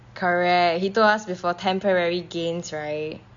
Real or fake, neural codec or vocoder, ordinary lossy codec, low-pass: real; none; MP3, 64 kbps; 7.2 kHz